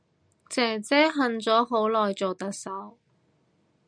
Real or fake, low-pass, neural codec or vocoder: real; 9.9 kHz; none